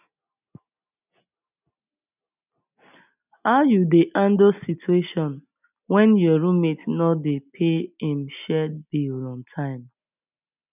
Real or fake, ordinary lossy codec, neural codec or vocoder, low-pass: real; none; none; 3.6 kHz